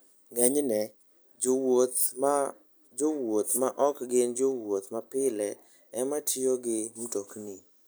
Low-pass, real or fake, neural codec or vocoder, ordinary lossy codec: none; real; none; none